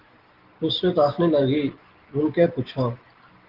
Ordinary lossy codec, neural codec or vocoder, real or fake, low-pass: Opus, 16 kbps; none; real; 5.4 kHz